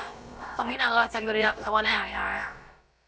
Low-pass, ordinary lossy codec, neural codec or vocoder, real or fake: none; none; codec, 16 kHz, about 1 kbps, DyCAST, with the encoder's durations; fake